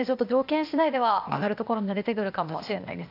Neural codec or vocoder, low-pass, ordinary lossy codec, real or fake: codec, 16 kHz, 0.8 kbps, ZipCodec; 5.4 kHz; none; fake